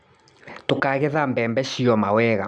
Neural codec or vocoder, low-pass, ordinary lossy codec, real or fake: none; none; none; real